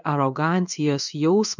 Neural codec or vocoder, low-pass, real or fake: codec, 16 kHz in and 24 kHz out, 1 kbps, XY-Tokenizer; 7.2 kHz; fake